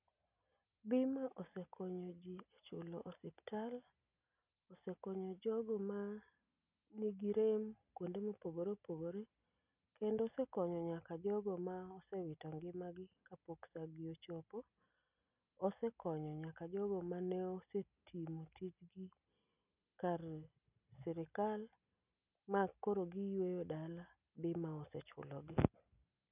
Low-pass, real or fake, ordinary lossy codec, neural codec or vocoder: 3.6 kHz; real; none; none